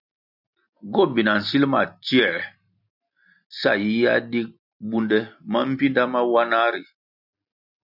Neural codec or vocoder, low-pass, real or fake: none; 5.4 kHz; real